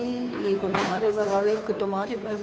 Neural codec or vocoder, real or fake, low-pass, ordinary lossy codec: codec, 16 kHz, 2 kbps, FunCodec, trained on Chinese and English, 25 frames a second; fake; none; none